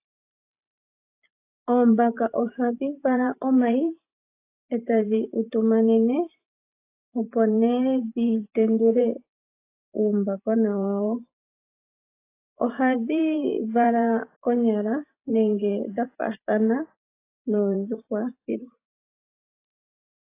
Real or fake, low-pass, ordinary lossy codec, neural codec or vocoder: fake; 3.6 kHz; AAC, 24 kbps; vocoder, 44.1 kHz, 128 mel bands every 512 samples, BigVGAN v2